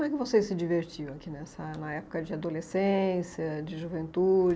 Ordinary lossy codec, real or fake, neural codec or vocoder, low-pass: none; real; none; none